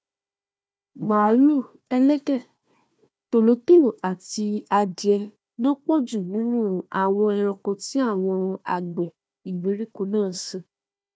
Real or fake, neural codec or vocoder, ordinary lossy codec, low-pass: fake; codec, 16 kHz, 1 kbps, FunCodec, trained on Chinese and English, 50 frames a second; none; none